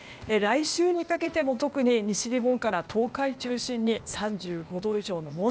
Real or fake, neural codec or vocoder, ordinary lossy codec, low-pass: fake; codec, 16 kHz, 0.8 kbps, ZipCodec; none; none